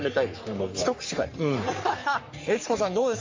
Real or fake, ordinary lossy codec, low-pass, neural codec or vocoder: fake; MP3, 48 kbps; 7.2 kHz; codec, 44.1 kHz, 3.4 kbps, Pupu-Codec